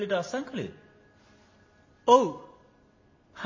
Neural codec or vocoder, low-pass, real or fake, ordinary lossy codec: none; 7.2 kHz; real; MP3, 32 kbps